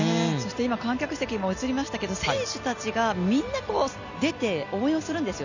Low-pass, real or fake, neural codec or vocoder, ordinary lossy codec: 7.2 kHz; real; none; none